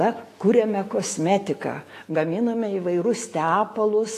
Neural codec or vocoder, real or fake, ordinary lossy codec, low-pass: autoencoder, 48 kHz, 128 numbers a frame, DAC-VAE, trained on Japanese speech; fake; AAC, 48 kbps; 14.4 kHz